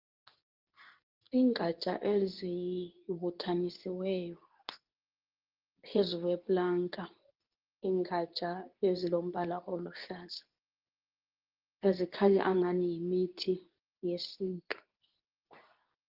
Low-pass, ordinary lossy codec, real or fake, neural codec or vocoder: 5.4 kHz; Opus, 32 kbps; fake; codec, 24 kHz, 0.9 kbps, WavTokenizer, medium speech release version 2